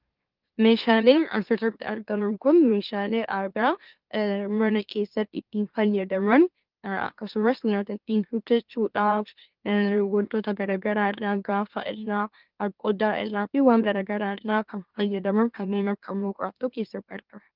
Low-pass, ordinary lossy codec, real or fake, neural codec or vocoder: 5.4 kHz; Opus, 32 kbps; fake; autoencoder, 44.1 kHz, a latent of 192 numbers a frame, MeloTTS